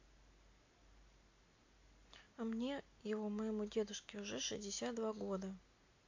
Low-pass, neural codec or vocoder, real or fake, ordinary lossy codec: 7.2 kHz; none; real; AAC, 48 kbps